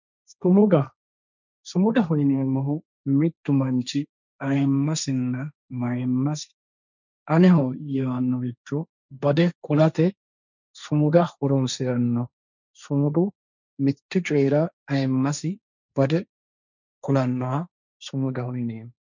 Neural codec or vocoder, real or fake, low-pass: codec, 16 kHz, 1.1 kbps, Voila-Tokenizer; fake; 7.2 kHz